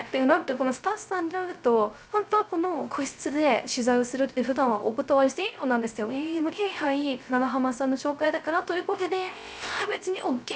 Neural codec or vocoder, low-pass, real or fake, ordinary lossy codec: codec, 16 kHz, 0.3 kbps, FocalCodec; none; fake; none